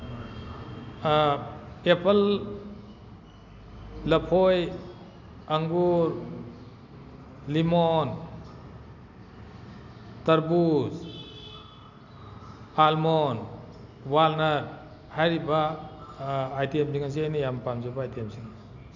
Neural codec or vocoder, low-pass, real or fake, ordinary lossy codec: none; 7.2 kHz; real; none